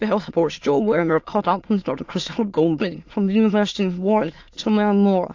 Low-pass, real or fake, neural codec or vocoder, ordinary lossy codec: 7.2 kHz; fake; autoencoder, 22.05 kHz, a latent of 192 numbers a frame, VITS, trained on many speakers; AAC, 48 kbps